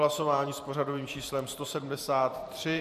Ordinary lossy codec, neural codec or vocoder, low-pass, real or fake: MP3, 96 kbps; vocoder, 44.1 kHz, 128 mel bands every 512 samples, BigVGAN v2; 14.4 kHz; fake